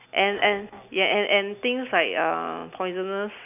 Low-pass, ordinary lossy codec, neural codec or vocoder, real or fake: 3.6 kHz; none; none; real